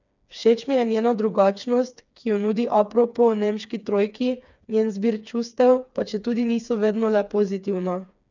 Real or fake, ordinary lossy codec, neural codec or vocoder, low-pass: fake; none; codec, 16 kHz, 4 kbps, FreqCodec, smaller model; 7.2 kHz